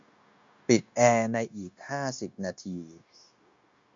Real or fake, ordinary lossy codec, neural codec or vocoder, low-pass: fake; MP3, 48 kbps; codec, 16 kHz, 0.9 kbps, LongCat-Audio-Codec; 7.2 kHz